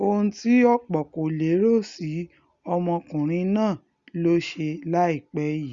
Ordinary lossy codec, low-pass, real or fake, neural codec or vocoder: Opus, 64 kbps; 7.2 kHz; real; none